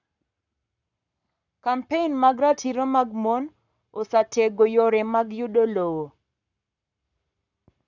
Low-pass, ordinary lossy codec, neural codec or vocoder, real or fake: 7.2 kHz; none; codec, 44.1 kHz, 7.8 kbps, Pupu-Codec; fake